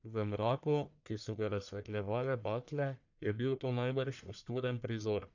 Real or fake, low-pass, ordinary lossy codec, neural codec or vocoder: fake; 7.2 kHz; none; codec, 44.1 kHz, 1.7 kbps, Pupu-Codec